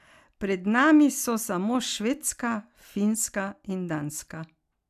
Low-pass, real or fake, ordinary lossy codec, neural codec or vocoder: 14.4 kHz; real; none; none